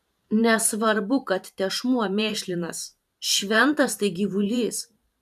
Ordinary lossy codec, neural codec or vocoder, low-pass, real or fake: AAC, 96 kbps; vocoder, 44.1 kHz, 128 mel bands every 512 samples, BigVGAN v2; 14.4 kHz; fake